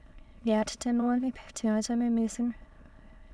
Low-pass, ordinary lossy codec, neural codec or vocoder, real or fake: none; none; autoencoder, 22.05 kHz, a latent of 192 numbers a frame, VITS, trained on many speakers; fake